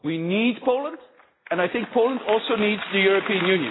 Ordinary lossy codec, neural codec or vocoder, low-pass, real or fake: AAC, 16 kbps; none; 7.2 kHz; real